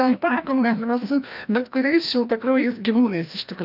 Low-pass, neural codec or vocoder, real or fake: 5.4 kHz; codec, 16 kHz, 1 kbps, FreqCodec, larger model; fake